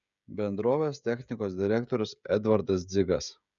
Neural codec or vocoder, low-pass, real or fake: codec, 16 kHz, 16 kbps, FreqCodec, smaller model; 7.2 kHz; fake